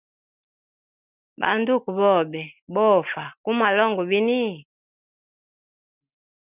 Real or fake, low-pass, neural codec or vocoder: real; 3.6 kHz; none